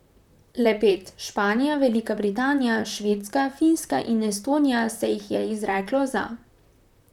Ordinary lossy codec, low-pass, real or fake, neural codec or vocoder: none; 19.8 kHz; fake; vocoder, 44.1 kHz, 128 mel bands, Pupu-Vocoder